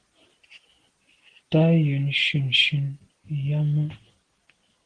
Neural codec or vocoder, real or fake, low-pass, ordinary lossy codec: none; real; 9.9 kHz; Opus, 16 kbps